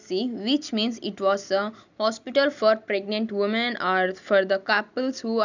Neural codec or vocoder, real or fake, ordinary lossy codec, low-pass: none; real; none; 7.2 kHz